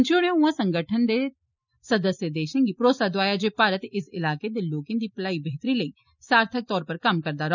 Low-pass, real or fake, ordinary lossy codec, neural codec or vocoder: 7.2 kHz; real; none; none